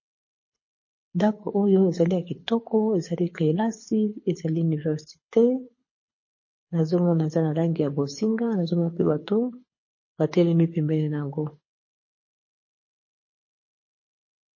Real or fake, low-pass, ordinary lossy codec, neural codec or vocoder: fake; 7.2 kHz; MP3, 32 kbps; codec, 24 kHz, 6 kbps, HILCodec